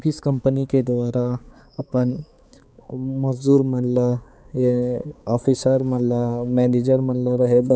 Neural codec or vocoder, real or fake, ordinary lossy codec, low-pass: codec, 16 kHz, 4 kbps, X-Codec, HuBERT features, trained on balanced general audio; fake; none; none